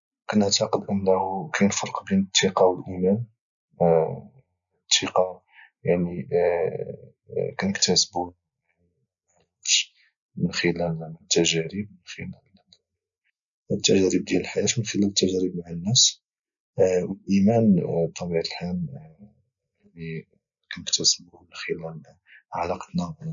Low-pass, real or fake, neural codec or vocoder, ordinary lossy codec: 7.2 kHz; real; none; none